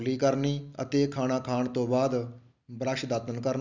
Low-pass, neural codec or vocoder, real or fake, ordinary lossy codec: 7.2 kHz; none; real; none